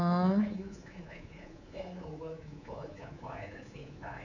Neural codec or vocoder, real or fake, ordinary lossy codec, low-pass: codec, 24 kHz, 3.1 kbps, DualCodec; fake; none; 7.2 kHz